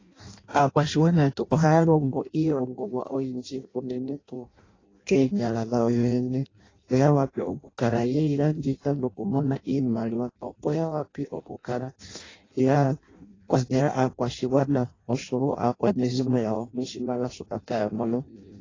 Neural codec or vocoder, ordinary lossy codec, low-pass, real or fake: codec, 16 kHz in and 24 kHz out, 0.6 kbps, FireRedTTS-2 codec; AAC, 32 kbps; 7.2 kHz; fake